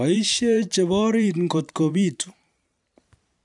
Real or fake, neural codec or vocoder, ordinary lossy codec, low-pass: fake; vocoder, 44.1 kHz, 128 mel bands every 512 samples, BigVGAN v2; none; 10.8 kHz